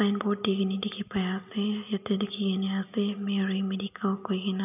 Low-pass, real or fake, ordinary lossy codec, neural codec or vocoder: 3.6 kHz; real; none; none